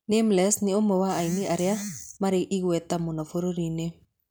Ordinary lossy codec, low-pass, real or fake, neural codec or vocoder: none; none; real; none